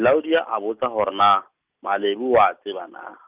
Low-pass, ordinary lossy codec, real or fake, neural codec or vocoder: 3.6 kHz; Opus, 24 kbps; real; none